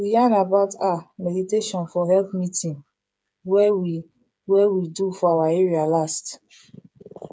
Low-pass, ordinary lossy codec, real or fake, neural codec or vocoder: none; none; fake; codec, 16 kHz, 8 kbps, FreqCodec, smaller model